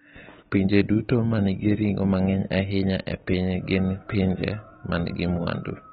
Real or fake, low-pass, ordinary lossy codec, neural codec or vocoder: real; 19.8 kHz; AAC, 16 kbps; none